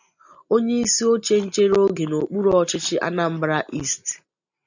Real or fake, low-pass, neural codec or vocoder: real; 7.2 kHz; none